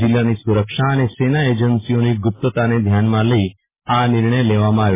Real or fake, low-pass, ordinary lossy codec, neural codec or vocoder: real; 3.6 kHz; MP3, 16 kbps; none